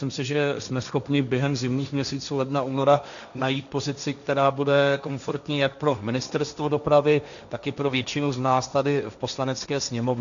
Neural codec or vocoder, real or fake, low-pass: codec, 16 kHz, 1.1 kbps, Voila-Tokenizer; fake; 7.2 kHz